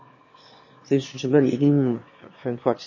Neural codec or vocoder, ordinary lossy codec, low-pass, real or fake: autoencoder, 22.05 kHz, a latent of 192 numbers a frame, VITS, trained on one speaker; MP3, 32 kbps; 7.2 kHz; fake